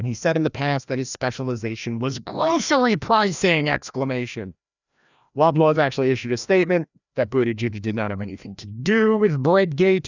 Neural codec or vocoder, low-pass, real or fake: codec, 16 kHz, 1 kbps, FreqCodec, larger model; 7.2 kHz; fake